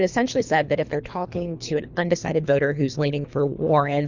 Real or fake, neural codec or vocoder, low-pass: fake; codec, 24 kHz, 3 kbps, HILCodec; 7.2 kHz